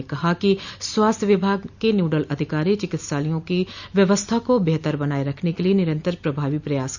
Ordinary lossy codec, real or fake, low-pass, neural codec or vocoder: none; real; 7.2 kHz; none